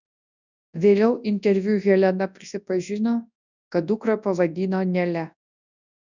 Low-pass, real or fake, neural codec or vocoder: 7.2 kHz; fake; codec, 24 kHz, 0.9 kbps, WavTokenizer, large speech release